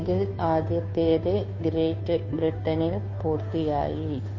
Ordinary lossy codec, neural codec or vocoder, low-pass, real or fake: MP3, 32 kbps; codec, 16 kHz, 2 kbps, FunCodec, trained on Chinese and English, 25 frames a second; 7.2 kHz; fake